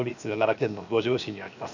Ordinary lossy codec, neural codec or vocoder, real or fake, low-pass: none; codec, 16 kHz, 0.7 kbps, FocalCodec; fake; 7.2 kHz